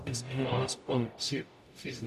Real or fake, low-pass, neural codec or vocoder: fake; 14.4 kHz; codec, 44.1 kHz, 0.9 kbps, DAC